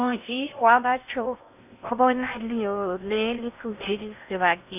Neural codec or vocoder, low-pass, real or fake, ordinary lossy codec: codec, 16 kHz in and 24 kHz out, 0.6 kbps, FocalCodec, streaming, 2048 codes; 3.6 kHz; fake; none